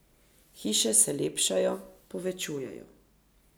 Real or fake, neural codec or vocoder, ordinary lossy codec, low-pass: real; none; none; none